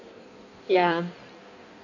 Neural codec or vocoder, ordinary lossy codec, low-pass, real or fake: codec, 16 kHz in and 24 kHz out, 1.1 kbps, FireRedTTS-2 codec; none; 7.2 kHz; fake